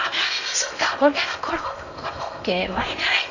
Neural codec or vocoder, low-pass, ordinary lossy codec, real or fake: codec, 16 kHz in and 24 kHz out, 0.8 kbps, FocalCodec, streaming, 65536 codes; 7.2 kHz; none; fake